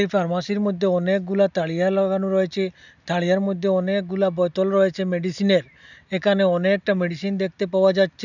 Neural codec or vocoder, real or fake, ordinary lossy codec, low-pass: none; real; none; 7.2 kHz